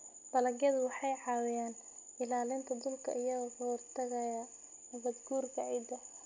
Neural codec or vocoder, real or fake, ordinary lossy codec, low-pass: none; real; none; 7.2 kHz